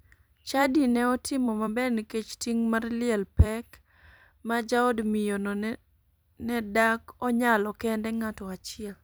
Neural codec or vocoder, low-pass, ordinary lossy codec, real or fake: vocoder, 44.1 kHz, 128 mel bands every 256 samples, BigVGAN v2; none; none; fake